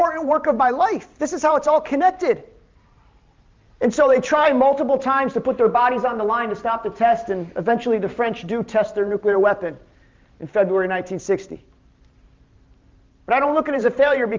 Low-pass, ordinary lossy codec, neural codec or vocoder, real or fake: 7.2 kHz; Opus, 32 kbps; vocoder, 44.1 kHz, 128 mel bands every 512 samples, BigVGAN v2; fake